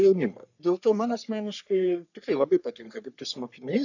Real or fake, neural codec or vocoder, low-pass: fake; codec, 44.1 kHz, 3.4 kbps, Pupu-Codec; 7.2 kHz